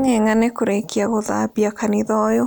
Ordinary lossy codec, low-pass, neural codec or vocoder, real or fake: none; none; none; real